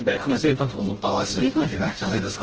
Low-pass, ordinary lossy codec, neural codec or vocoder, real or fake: 7.2 kHz; Opus, 16 kbps; codec, 16 kHz, 0.5 kbps, FreqCodec, smaller model; fake